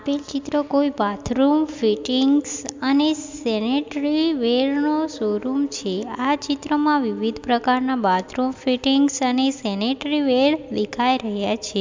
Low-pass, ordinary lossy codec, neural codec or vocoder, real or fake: 7.2 kHz; MP3, 64 kbps; none; real